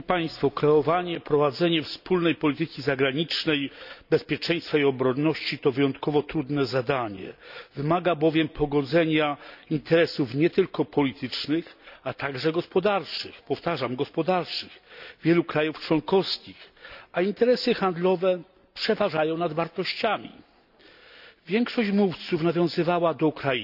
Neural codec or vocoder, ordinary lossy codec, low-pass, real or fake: none; none; 5.4 kHz; real